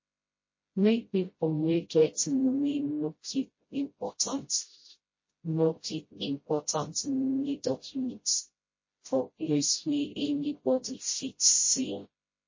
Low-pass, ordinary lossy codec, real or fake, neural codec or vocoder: 7.2 kHz; MP3, 32 kbps; fake; codec, 16 kHz, 0.5 kbps, FreqCodec, smaller model